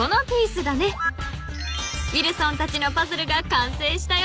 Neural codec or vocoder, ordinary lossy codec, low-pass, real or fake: none; none; none; real